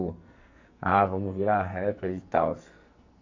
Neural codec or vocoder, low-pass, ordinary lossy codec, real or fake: codec, 44.1 kHz, 2.6 kbps, SNAC; 7.2 kHz; AAC, 48 kbps; fake